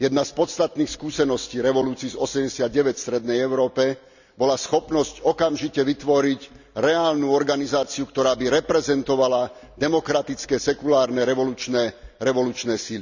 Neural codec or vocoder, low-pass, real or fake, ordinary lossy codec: none; 7.2 kHz; real; none